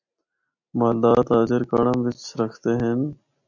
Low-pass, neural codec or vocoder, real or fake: 7.2 kHz; none; real